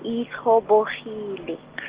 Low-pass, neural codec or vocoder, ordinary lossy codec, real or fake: 3.6 kHz; none; Opus, 16 kbps; real